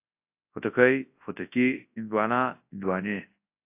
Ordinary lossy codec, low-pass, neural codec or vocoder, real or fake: AAC, 24 kbps; 3.6 kHz; codec, 24 kHz, 0.9 kbps, WavTokenizer, large speech release; fake